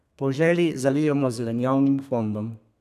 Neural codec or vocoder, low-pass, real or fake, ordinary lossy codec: codec, 32 kHz, 1.9 kbps, SNAC; 14.4 kHz; fake; none